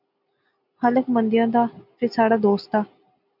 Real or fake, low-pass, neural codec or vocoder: real; 5.4 kHz; none